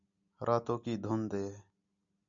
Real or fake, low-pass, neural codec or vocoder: real; 7.2 kHz; none